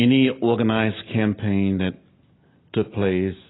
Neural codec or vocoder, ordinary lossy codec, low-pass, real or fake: none; AAC, 16 kbps; 7.2 kHz; real